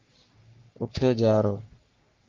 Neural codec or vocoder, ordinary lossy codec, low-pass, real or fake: codec, 44.1 kHz, 3.4 kbps, Pupu-Codec; Opus, 24 kbps; 7.2 kHz; fake